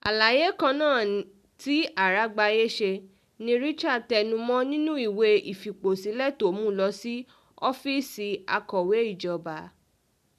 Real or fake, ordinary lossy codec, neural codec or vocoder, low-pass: real; none; none; 14.4 kHz